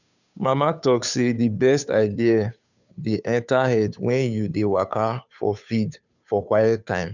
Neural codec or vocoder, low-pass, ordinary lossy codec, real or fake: codec, 16 kHz, 2 kbps, FunCodec, trained on Chinese and English, 25 frames a second; 7.2 kHz; none; fake